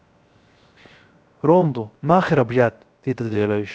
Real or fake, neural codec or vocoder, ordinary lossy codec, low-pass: fake; codec, 16 kHz, 0.7 kbps, FocalCodec; none; none